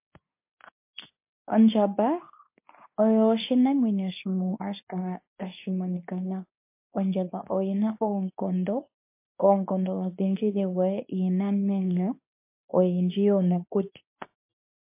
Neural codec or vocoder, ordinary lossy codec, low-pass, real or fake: codec, 24 kHz, 0.9 kbps, WavTokenizer, medium speech release version 2; MP3, 24 kbps; 3.6 kHz; fake